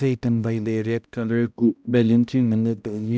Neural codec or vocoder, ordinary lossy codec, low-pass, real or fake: codec, 16 kHz, 0.5 kbps, X-Codec, HuBERT features, trained on balanced general audio; none; none; fake